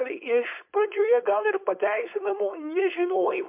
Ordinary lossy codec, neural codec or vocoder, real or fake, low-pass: MP3, 32 kbps; codec, 16 kHz, 4.8 kbps, FACodec; fake; 3.6 kHz